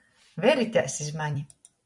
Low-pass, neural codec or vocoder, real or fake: 10.8 kHz; vocoder, 44.1 kHz, 128 mel bands every 256 samples, BigVGAN v2; fake